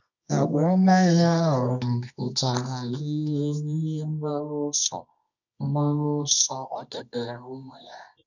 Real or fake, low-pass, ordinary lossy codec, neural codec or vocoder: fake; 7.2 kHz; none; codec, 24 kHz, 0.9 kbps, WavTokenizer, medium music audio release